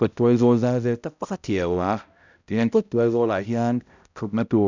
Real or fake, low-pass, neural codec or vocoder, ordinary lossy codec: fake; 7.2 kHz; codec, 16 kHz, 0.5 kbps, X-Codec, HuBERT features, trained on balanced general audio; none